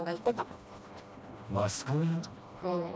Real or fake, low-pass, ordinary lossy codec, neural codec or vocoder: fake; none; none; codec, 16 kHz, 1 kbps, FreqCodec, smaller model